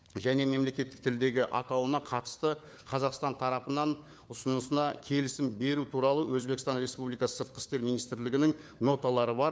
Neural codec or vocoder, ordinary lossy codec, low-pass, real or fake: codec, 16 kHz, 4 kbps, FunCodec, trained on LibriTTS, 50 frames a second; none; none; fake